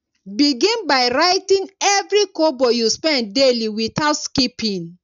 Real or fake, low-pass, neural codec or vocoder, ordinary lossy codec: real; 7.2 kHz; none; none